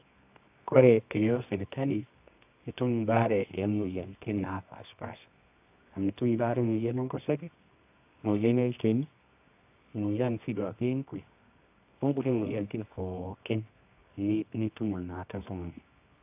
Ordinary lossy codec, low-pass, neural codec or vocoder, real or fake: AAC, 32 kbps; 3.6 kHz; codec, 24 kHz, 0.9 kbps, WavTokenizer, medium music audio release; fake